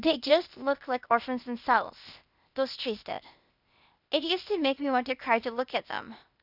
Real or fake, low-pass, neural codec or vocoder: fake; 5.4 kHz; codec, 16 kHz, 0.8 kbps, ZipCodec